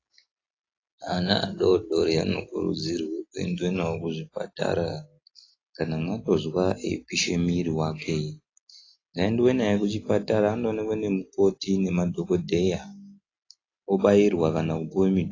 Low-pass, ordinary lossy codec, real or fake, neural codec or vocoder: 7.2 kHz; AAC, 32 kbps; real; none